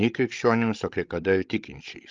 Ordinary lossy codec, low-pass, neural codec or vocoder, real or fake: Opus, 32 kbps; 7.2 kHz; codec, 16 kHz, 16 kbps, FreqCodec, larger model; fake